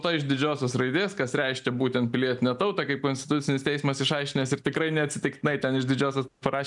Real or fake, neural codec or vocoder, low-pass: real; none; 10.8 kHz